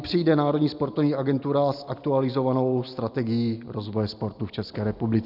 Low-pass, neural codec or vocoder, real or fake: 5.4 kHz; none; real